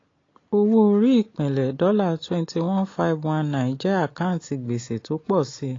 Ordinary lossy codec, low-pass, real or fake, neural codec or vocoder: AAC, 32 kbps; 7.2 kHz; real; none